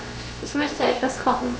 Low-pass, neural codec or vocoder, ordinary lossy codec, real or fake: none; codec, 16 kHz, about 1 kbps, DyCAST, with the encoder's durations; none; fake